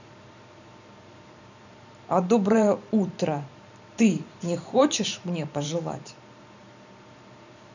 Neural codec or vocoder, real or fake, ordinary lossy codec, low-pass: vocoder, 44.1 kHz, 128 mel bands every 512 samples, BigVGAN v2; fake; none; 7.2 kHz